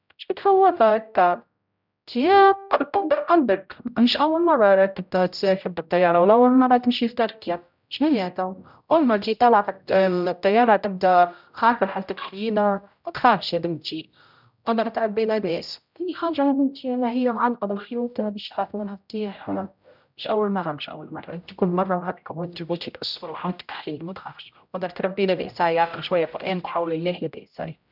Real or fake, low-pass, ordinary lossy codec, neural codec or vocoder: fake; 5.4 kHz; none; codec, 16 kHz, 0.5 kbps, X-Codec, HuBERT features, trained on general audio